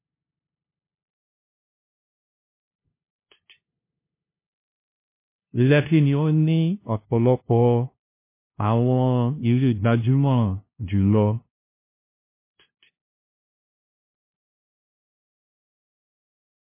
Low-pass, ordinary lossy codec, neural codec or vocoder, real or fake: 3.6 kHz; MP3, 24 kbps; codec, 16 kHz, 0.5 kbps, FunCodec, trained on LibriTTS, 25 frames a second; fake